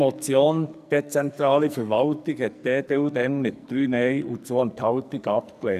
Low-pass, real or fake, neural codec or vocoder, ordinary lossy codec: 14.4 kHz; fake; codec, 44.1 kHz, 2.6 kbps, SNAC; none